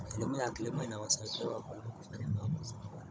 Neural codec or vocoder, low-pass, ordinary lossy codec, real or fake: codec, 16 kHz, 16 kbps, FunCodec, trained on Chinese and English, 50 frames a second; none; none; fake